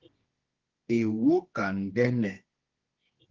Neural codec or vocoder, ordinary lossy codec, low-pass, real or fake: codec, 24 kHz, 0.9 kbps, WavTokenizer, medium music audio release; Opus, 16 kbps; 7.2 kHz; fake